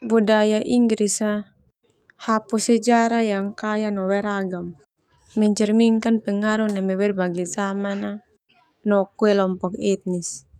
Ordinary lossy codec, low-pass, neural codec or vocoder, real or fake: none; 19.8 kHz; codec, 44.1 kHz, 7.8 kbps, DAC; fake